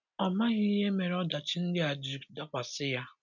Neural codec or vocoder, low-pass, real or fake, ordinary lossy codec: none; 7.2 kHz; real; none